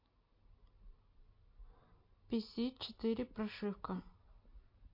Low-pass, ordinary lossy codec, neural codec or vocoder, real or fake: 5.4 kHz; MP3, 32 kbps; none; real